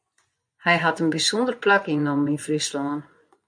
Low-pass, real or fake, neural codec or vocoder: 9.9 kHz; fake; vocoder, 24 kHz, 100 mel bands, Vocos